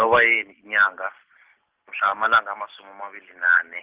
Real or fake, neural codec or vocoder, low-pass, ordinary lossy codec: real; none; 3.6 kHz; Opus, 16 kbps